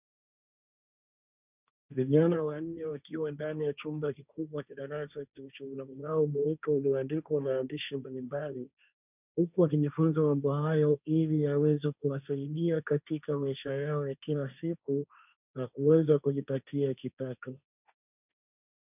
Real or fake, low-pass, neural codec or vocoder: fake; 3.6 kHz; codec, 16 kHz, 1.1 kbps, Voila-Tokenizer